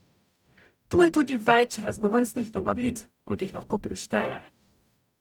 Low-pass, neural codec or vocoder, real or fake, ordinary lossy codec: 19.8 kHz; codec, 44.1 kHz, 0.9 kbps, DAC; fake; none